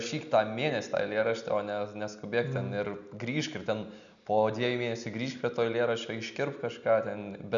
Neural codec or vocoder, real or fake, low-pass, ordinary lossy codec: none; real; 7.2 kHz; MP3, 96 kbps